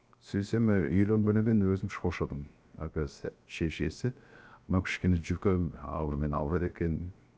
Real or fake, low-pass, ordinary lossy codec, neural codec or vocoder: fake; none; none; codec, 16 kHz, 0.7 kbps, FocalCodec